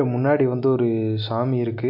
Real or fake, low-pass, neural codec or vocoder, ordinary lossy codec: real; 5.4 kHz; none; MP3, 48 kbps